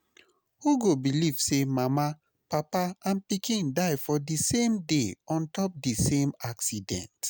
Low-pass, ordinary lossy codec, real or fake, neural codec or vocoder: none; none; real; none